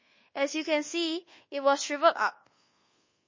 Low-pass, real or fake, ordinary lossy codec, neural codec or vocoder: 7.2 kHz; fake; MP3, 32 kbps; codec, 24 kHz, 1.2 kbps, DualCodec